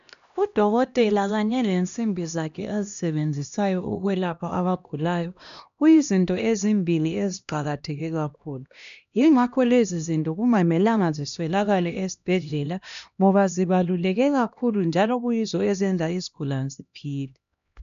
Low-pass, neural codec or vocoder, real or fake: 7.2 kHz; codec, 16 kHz, 1 kbps, X-Codec, HuBERT features, trained on LibriSpeech; fake